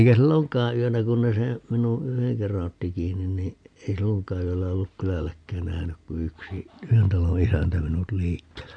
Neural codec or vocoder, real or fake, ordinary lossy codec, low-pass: none; real; AAC, 64 kbps; 9.9 kHz